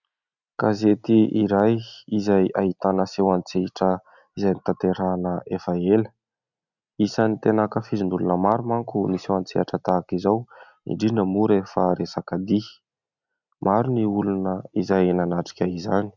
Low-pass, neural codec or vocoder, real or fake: 7.2 kHz; none; real